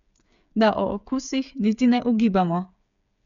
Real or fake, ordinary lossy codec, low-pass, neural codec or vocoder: fake; none; 7.2 kHz; codec, 16 kHz, 8 kbps, FreqCodec, smaller model